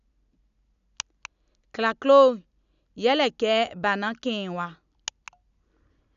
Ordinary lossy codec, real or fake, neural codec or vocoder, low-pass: none; real; none; 7.2 kHz